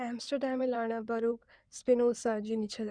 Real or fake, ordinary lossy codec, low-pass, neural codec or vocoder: fake; Opus, 64 kbps; 9.9 kHz; codec, 16 kHz in and 24 kHz out, 2.2 kbps, FireRedTTS-2 codec